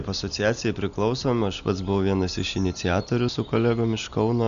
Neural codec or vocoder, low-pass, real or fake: none; 7.2 kHz; real